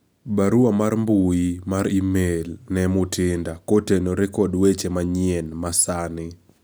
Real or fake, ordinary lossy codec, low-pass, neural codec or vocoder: real; none; none; none